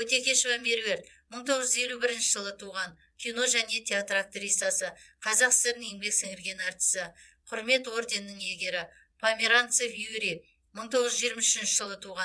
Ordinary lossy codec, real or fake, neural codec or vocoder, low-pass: none; fake; vocoder, 22.05 kHz, 80 mel bands, Vocos; none